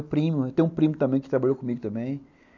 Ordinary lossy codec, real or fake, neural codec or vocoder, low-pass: none; real; none; 7.2 kHz